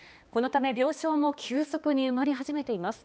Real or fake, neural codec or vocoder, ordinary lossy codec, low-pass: fake; codec, 16 kHz, 2 kbps, X-Codec, HuBERT features, trained on balanced general audio; none; none